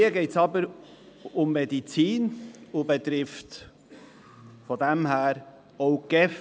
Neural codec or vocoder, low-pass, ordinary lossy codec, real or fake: none; none; none; real